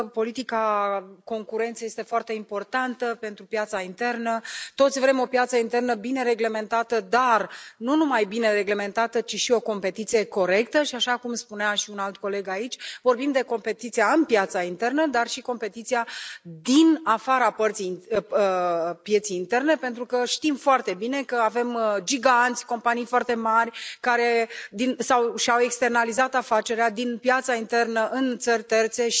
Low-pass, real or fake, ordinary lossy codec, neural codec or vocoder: none; real; none; none